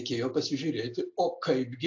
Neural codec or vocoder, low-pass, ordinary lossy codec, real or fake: none; 7.2 kHz; AAC, 48 kbps; real